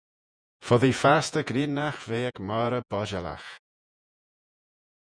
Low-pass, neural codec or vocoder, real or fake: 9.9 kHz; vocoder, 48 kHz, 128 mel bands, Vocos; fake